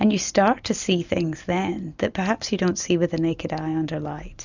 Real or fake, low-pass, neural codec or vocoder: real; 7.2 kHz; none